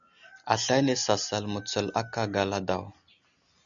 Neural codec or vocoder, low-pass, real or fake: none; 7.2 kHz; real